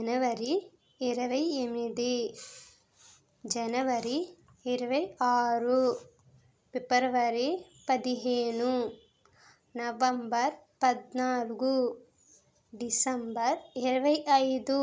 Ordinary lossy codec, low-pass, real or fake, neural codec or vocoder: none; none; real; none